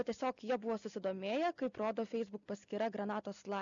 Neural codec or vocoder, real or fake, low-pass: none; real; 7.2 kHz